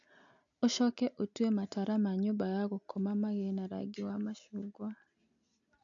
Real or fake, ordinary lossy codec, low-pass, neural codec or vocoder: real; AAC, 64 kbps; 7.2 kHz; none